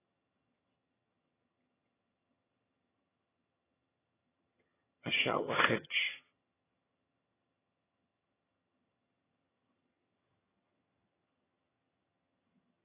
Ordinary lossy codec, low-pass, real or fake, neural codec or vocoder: AAC, 16 kbps; 3.6 kHz; fake; vocoder, 22.05 kHz, 80 mel bands, HiFi-GAN